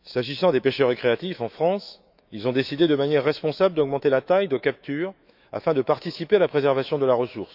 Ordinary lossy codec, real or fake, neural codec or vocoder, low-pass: none; fake; autoencoder, 48 kHz, 128 numbers a frame, DAC-VAE, trained on Japanese speech; 5.4 kHz